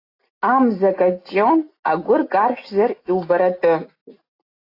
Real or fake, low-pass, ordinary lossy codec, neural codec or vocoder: real; 5.4 kHz; AAC, 32 kbps; none